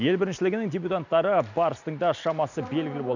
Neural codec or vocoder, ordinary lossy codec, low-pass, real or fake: none; none; 7.2 kHz; real